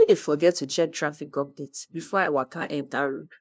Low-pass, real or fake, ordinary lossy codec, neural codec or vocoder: none; fake; none; codec, 16 kHz, 0.5 kbps, FunCodec, trained on LibriTTS, 25 frames a second